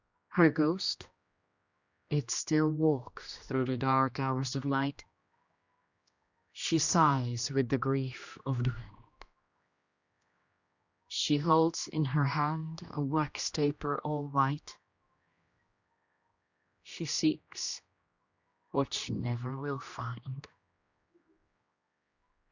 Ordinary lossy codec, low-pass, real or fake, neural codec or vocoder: Opus, 64 kbps; 7.2 kHz; fake; codec, 16 kHz, 1 kbps, X-Codec, HuBERT features, trained on general audio